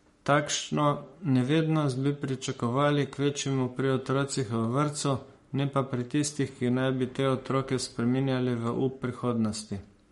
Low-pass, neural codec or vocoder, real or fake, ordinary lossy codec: 19.8 kHz; codec, 44.1 kHz, 7.8 kbps, Pupu-Codec; fake; MP3, 48 kbps